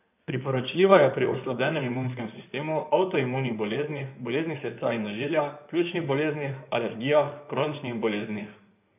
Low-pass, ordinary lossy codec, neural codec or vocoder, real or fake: 3.6 kHz; none; codec, 16 kHz in and 24 kHz out, 2.2 kbps, FireRedTTS-2 codec; fake